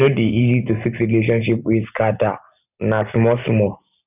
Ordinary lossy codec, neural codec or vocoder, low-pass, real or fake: none; none; 3.6 kHz; real